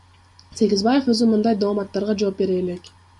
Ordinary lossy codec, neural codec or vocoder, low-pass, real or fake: MP3, 96 kbps; none; 10.8 kHz; real